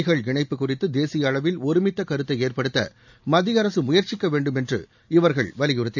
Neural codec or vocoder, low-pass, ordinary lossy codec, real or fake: none; 7.2 kHz; none; real